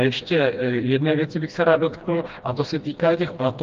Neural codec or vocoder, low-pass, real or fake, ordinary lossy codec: codec, 16 kHz, 1 kbps, FreqCodec, smaller model; 7.2 kHz; fake; Opus, 24 kbps